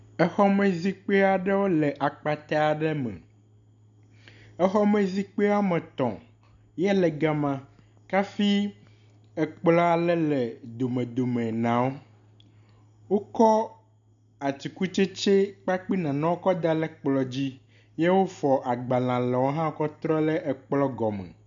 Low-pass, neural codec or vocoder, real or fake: 7.2 kHz; none; real